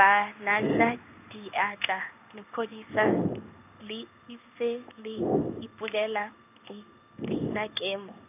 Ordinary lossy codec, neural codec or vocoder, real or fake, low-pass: none; codec, 16 kHz in and 24 kHz out, 1 kbps, XY-Tokenizer; fake; 3.6 kHz